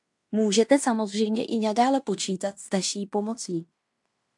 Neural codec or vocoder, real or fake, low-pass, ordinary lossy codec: codec, 16 kHz in and 24 kHz out, 0.9 kbps, LongCat-Audio-Codec, fine tuned four codebook decoder; fake; 10.8 kHz; AAC, 64 kbps